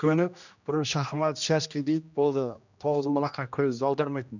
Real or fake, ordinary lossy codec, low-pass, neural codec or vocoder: fake; none; 7.2 kHz; codec, 16 kHz, 1 kbps, X-Codec, HuBERT features, trained on general audio